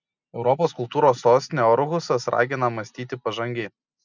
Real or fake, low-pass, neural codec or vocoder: real; 7.2 kHz; none